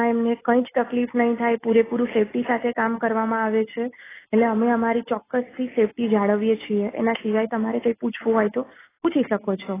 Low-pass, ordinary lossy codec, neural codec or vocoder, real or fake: 3.6 kHz; AAC, 16 kbps; none; real